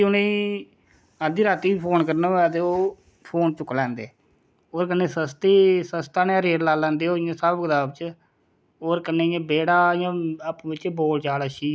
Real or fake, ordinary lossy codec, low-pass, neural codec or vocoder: real; none; none; none